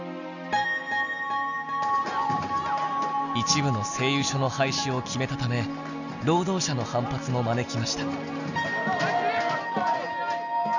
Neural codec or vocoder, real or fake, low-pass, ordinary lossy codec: none; real; 7.2 kHz; none